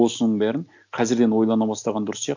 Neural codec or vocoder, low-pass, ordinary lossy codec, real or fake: none; 7.2 kHz; none; real